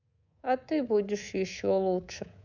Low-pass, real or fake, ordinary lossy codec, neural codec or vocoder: 7.2 kHz; fake; none; codec, 24 kHz, 3.1 kbps, DualCodec